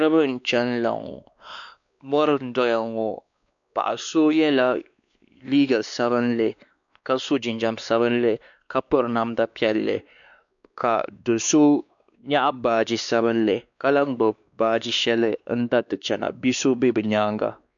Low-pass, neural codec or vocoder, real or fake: 7.2 kHz; codec, 16 kHz, 2 kbps, X-Codec, WavLM features, trained on Multilingual LibriSpeech; fake